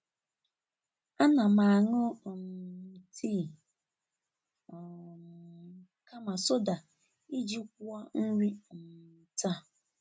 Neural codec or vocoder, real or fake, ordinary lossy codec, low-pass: none; real; none; none